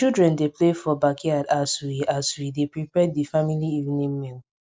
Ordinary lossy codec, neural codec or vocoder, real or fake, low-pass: none; none; real; none